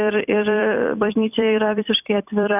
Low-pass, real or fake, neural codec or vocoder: 3.6 kHz; fake; vocoder, 44.1 kHz, 128 mel bands every 256 samples, BigVGAN v2